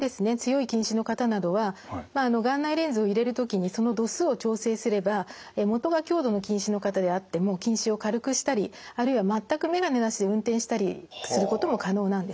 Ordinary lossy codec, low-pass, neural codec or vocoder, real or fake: none; none; none; real